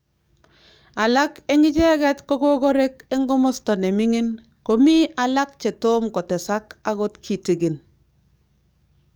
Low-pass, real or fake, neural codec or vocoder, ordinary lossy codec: none; fake; codec, 44.1 kHz, 7.8 kbps, DAC; none